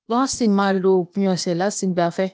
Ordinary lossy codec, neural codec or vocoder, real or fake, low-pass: none; codec, 16 kHz, 0.8 kbps, ZipCodec; fake; none